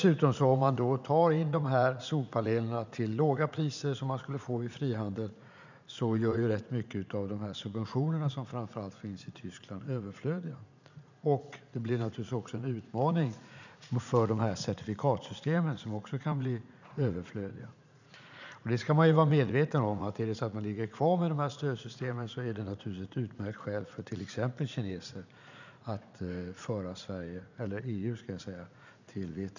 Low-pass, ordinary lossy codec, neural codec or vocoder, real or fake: 7.2 kHz; none; vocoder, 44.1 kHz, 80 mel bands, Vocos; fake